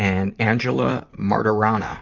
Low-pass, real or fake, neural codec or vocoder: 7.2 kHz; fake; vocoder, 44.1 kHz, 128 mel bands, Pupu-Vocoder